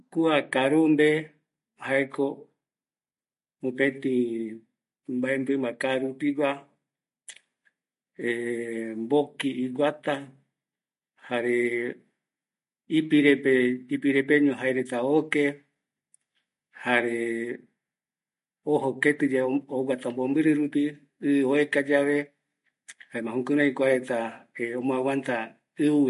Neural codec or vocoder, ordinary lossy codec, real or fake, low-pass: none; MP3, 48 kbps; real; 14.4 kHz